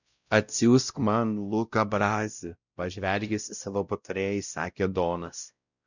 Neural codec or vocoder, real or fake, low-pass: codec, 16 kHz, 0.5 kbps, X-Codec, WavLM features, trained on Multilingual LibriSpeech; fake; 7.2 kHz